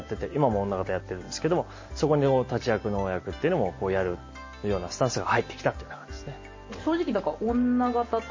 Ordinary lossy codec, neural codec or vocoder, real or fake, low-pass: MP3, 32 kbps; none; real; 7.2 kHz